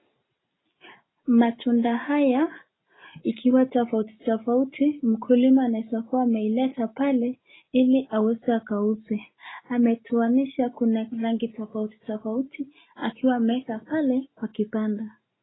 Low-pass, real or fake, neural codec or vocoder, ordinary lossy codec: 7.2 kHz; real; none; AAC, 16 kbps